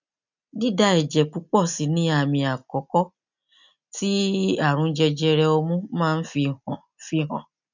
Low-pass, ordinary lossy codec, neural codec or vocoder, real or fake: 7.2 kHz; none; none; real